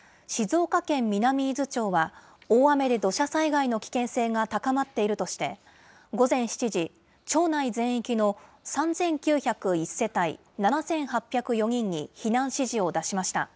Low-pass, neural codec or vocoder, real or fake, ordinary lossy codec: none; none; real; none